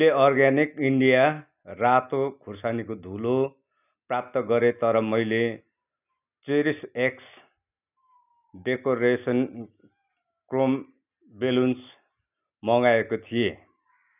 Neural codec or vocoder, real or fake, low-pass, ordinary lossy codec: none; real; 3.6 kHz; none